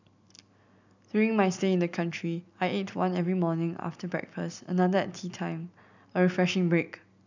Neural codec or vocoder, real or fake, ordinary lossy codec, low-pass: none; real; none; 7.2 kHz